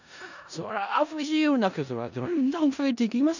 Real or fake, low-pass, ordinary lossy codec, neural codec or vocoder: fake; 7.2 kHz; none; codec, 16 kHz in and 24 kHz out, 0.4 kbps, LongCat-Audio-Codec, four codebook decoder